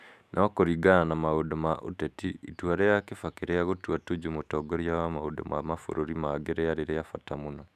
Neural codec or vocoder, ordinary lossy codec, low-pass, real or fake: autoencoder, 48 kHz, 128 numbers a frame, DAC-VAE, trained on Japanese speech; none; 14.4 kHz; fake